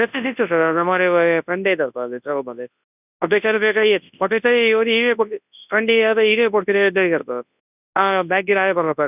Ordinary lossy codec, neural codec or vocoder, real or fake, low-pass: none; codec, 24 kHz, 0.9 kbps, WavTokenizer, large speech release; fake; 3.6 kHz